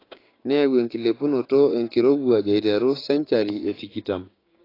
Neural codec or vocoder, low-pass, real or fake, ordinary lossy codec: codec, 16 kHz, 6 kbps, DAC; 5.4 kHz; fake; AAC, 24 kbps